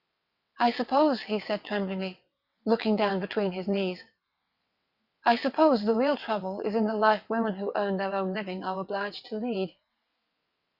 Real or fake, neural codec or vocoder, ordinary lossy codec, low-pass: fake; vocoder, 22.05 kHz, 80 mel bands, WaveNeXt; Opus, 64 kbps; 5.4 kHz